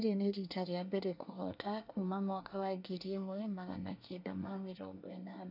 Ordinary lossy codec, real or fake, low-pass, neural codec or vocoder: none; fake; 5.4 kHz; codec, 24 kHz, 1 kbps, SNAC